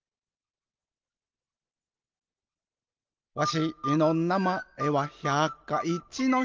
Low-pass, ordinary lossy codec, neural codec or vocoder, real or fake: 7.2 kHz; Opus, 32 kbps; none; real